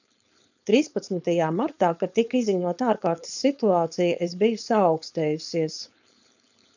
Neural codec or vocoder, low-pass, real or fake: codec, 16 kHz, 4.8 kbps, FACodec; 7.2 kHz; fake